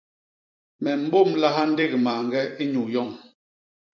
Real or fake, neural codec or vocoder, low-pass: fake; vocoder, 44.1 kHz, 128 mel bands every 256 samples, BigVGAN v2; 7.2 kHz